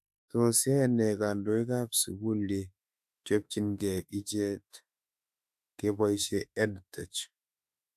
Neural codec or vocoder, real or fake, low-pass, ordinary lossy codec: autoencoder, 48 kHz, 32 numbers a frame, DAC-VAE, trained on Japanese speech; fake; 14.4 kHz; AAC, 96 kbps